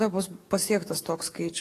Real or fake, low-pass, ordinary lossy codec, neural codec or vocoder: fake; 14.4 kHz; AAC, 48 kbps; codec, 44.1 kHz, 7.8 kbps, DAC